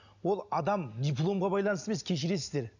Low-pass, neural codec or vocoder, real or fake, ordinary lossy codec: 7.2 kHz; none; real; none